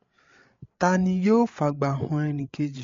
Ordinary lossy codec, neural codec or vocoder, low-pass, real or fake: MP3, 48 kbps; codec, 16 kHz, 8 kbps, FreqCodec, larger model; 7.2 kHz; fake